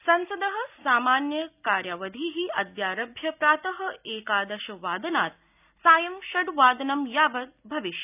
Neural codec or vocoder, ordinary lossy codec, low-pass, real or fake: none; none; 3.6 kHz; real